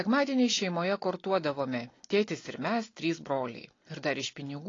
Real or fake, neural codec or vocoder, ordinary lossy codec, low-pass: real; none; AAC, 32 kbps; 7.2 kHz